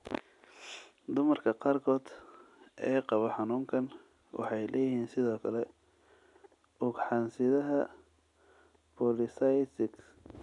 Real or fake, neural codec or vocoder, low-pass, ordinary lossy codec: real; none; 10.8 kHz; none